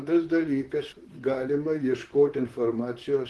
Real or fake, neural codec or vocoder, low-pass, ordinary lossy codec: fake; vocoder, 44.1 kHz, 128 mel bands, Pupu-Vocoder; 10.8 kHz; Opus, 16 kbps